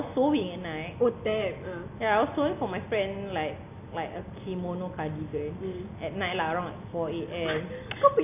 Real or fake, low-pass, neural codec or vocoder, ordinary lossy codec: real; 3.6 kHz; none; none